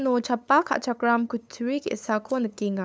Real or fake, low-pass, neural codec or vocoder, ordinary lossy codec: fake; none; codec, 16 kHz, 8 kbps, FunCodec, trained on LibriTTS, 25 frames a second; none